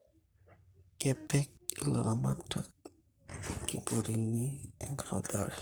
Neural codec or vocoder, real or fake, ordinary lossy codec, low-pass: codec, 44.1 kHz, 3.4 kbps, Pupu-Codec; fake; none; none